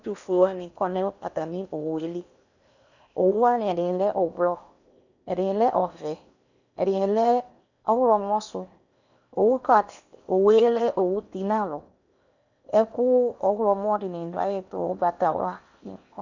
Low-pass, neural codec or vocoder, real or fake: 7.2 kHz; codec, 16 kHz in and 24 kHz out, 0.8 kbps, FocalCodec, streaming, 65536 codes; fake